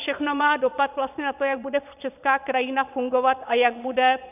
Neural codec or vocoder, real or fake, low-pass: vocoder, 22.05 kHz, 80 mel bands, Vocos; fake; 3.6 kHz